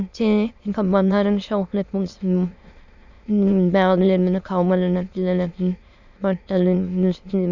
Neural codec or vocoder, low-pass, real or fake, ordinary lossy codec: autoencoder, 22.05 kHz, a latent of 192 numbers a frame, VITS, trained on many speakers; 7.2 kHz; fake; none